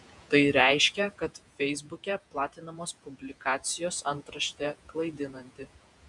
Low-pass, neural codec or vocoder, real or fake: 10.8 kHz; vocoder, 48 kHz, 128 mel bands, Vocos; fake